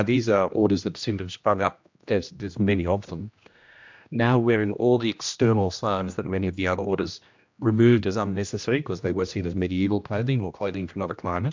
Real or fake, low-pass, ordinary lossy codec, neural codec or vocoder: fake; 7.2 kHz; MP3, 64 kbps; codec, 16 kHz, 1 kbps, X-Codec, HuBERT features, trained on general audio